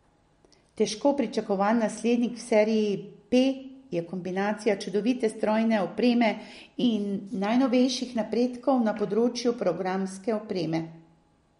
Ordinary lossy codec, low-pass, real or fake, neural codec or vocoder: MP3, 48 kbps; 10.8 kHz; real; none